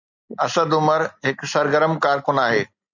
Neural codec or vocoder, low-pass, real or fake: none; 7.2 kHz; real